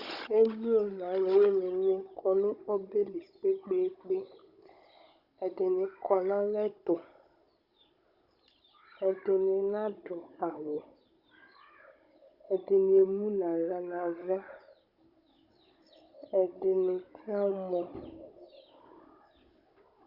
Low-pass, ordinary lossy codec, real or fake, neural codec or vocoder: 5.4 kHz; Opus, 64 kbps; fake; codec, 16 kHz, 16 kbps, FunCodec, trained on Chinese and English, 50 frames a second